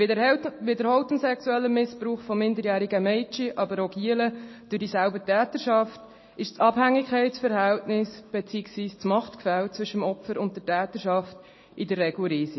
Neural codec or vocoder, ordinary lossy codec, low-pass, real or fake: none; MP3, 24 kbps; 7.2 kHz; real